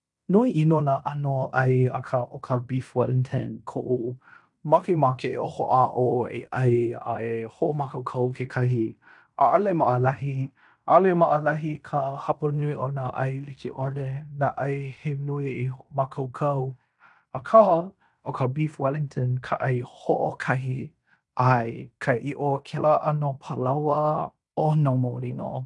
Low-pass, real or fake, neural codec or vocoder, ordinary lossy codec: 10.8 kHz; fake; codec, 16 kHz in and 24 kHz out, 0.9 kbps, LongCat-Audio-Codec, fine tuned four codebook decoder; none